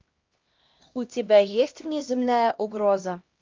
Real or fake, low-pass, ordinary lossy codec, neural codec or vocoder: fake; 7.2 kHz; Opus, 32 kbps; codec, 16 kHz, 1 kbps, X-Codec, HuBERT features, trained on LibriSpeech